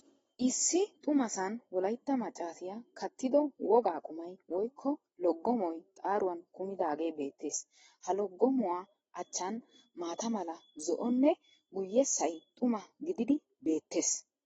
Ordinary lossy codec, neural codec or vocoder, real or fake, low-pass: AAC, 24 kbps; none; real; 10.8 kHz